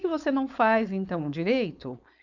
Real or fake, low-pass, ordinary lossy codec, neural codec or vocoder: fake; 7.2 kHz; none; codec, 16 kHz, 4.8 kbps, FACodec